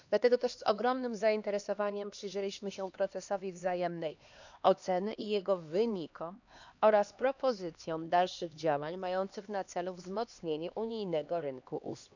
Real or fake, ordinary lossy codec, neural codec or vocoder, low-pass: fake; none; codec, 16 kHz, 2 kbps, X-Codec, HuBERT features, trained on LibriSpeech; 7.2 kHz